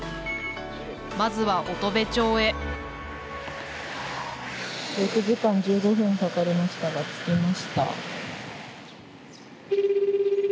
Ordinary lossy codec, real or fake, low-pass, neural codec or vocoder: none; real; none; none